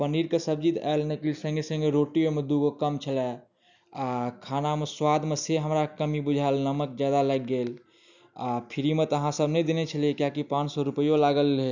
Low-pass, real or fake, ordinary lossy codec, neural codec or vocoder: 7.2 kHz; real; none; none